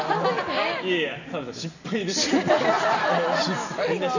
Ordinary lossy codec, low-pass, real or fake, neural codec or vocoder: none; 7.2 kHz; real; none